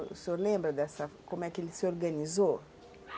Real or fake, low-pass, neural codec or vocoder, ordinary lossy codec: real; none; none; none